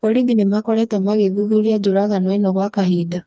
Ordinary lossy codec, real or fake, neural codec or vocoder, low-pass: none; fake; codec, 16 kHz, 2 kbps, FreqCodec, smaller model; none